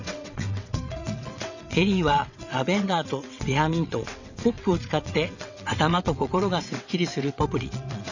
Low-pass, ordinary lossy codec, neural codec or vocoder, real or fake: 7.2 kHz; none; vocoder, 22.05 kHz, 80 mel bands, WaveNeXt; fake